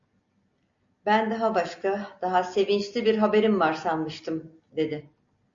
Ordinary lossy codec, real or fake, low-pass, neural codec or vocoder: MP3, 64 kbps; real; 7.2 kHz; none